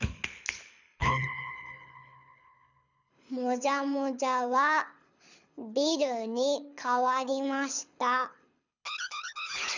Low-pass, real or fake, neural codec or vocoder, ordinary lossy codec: 7.2 kHz; fake; codec, 24 kHz, 6 kbps, HILCodec; none